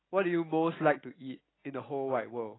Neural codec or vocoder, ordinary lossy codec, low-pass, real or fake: none; AAC, 16 kbps; 7.2 kHz; real